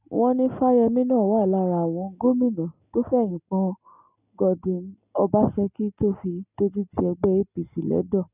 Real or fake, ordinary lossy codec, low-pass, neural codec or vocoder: real; none; 3.6 kHz; none